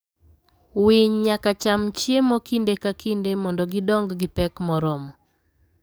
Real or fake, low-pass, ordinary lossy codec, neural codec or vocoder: fake; none; none; codec, 44.1 kHz, 7.8 kbps, DAC